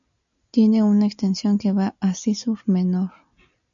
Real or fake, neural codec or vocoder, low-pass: real; none; 7.2 kHz